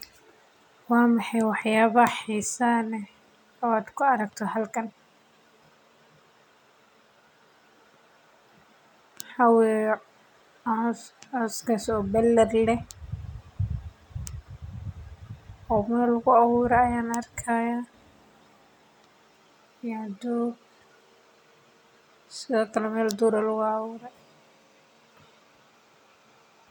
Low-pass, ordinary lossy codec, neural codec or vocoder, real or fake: 19.8 kHz; none; none; real